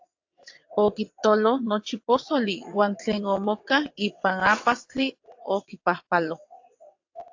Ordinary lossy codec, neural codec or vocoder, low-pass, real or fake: AAC, 48 kbps; vocoder, 22.05 kHz, 80 mel bands, WaveNeXt; 7.2 kHz; fake